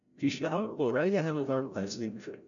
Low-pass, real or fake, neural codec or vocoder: 7.2 kHz; fake; codec, 16 kHz, 0.5 kbps, FreqCodec, larger model